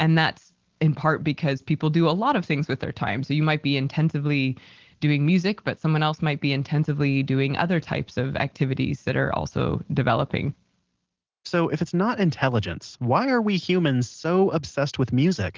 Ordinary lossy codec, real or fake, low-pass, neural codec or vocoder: Opus, 16 kbps; real; 7.2 kHz; none